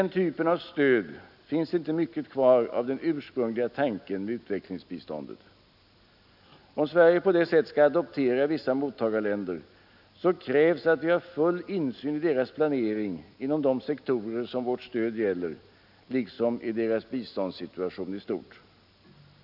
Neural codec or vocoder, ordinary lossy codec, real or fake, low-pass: none; none; real; 5.4 kHz